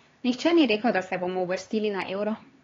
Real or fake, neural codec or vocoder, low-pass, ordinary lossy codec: fake; codec, 16 kHz, 4 kbps, X-Codec, HuBERT features, trained on LibriSpeech; 7.2 kHz; AAC, 32 kbps